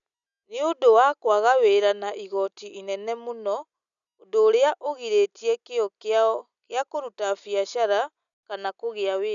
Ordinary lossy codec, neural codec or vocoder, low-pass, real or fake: none; none; 7.2 kHz; real